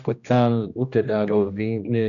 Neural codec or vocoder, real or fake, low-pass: codec, 16 kHz, 1 kbps, X-Codec, HuBERT features, trained on general audio; fake; 7.2 kHz